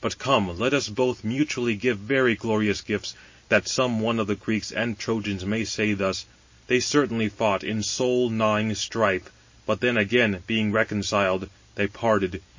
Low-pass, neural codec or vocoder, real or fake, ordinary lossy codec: 7.2 kHz; none; real; MP3, 32 kbps